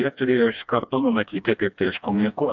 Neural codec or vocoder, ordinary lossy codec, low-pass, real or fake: codec, 16 kHz, 1 kbps, FreqCodec, smaller model; MP3, 64 kbps; 7.2 kHz; fake